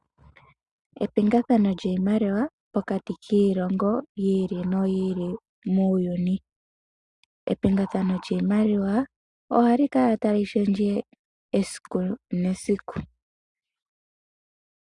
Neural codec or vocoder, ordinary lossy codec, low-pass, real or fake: none; Opus, 64 kbps; 10.8 kHz; real